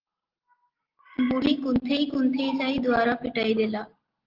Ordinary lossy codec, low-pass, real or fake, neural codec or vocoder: Opus, 16 kbps; 5.4 kHz; real; none